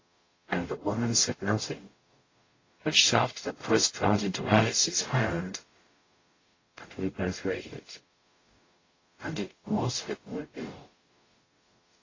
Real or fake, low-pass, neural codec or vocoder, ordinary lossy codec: fake; 7.2 kHz; codec, 44.1 kHz, 0.9 kbps, DAC; AAC, 32 kbps